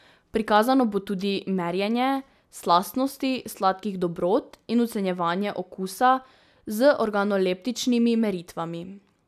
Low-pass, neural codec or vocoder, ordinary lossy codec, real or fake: 14.4 kHz; none; none; real